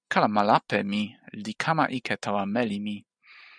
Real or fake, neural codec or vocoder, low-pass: real; none; 9.9 kHz